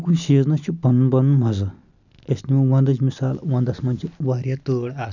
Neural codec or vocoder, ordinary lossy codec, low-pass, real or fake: none; none; 7.2 kHz; real